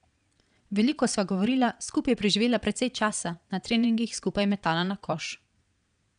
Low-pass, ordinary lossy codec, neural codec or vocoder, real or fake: 9.9 kHz; none; vocoder, 22.05 kHz, 80 mel bands, Vocos; fake